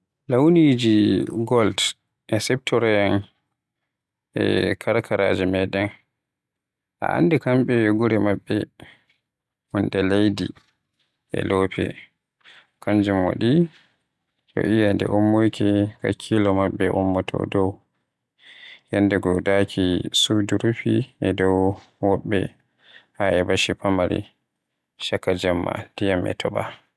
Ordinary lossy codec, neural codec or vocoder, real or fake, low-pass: none; none; real; none